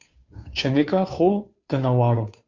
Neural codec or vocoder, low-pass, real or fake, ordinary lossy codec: codec, 16 kHz, 2 kbps, FunCodec, trained on Chinese and English, 25 frames a second; 7.2 kHz; fake; Opus, 64 kbps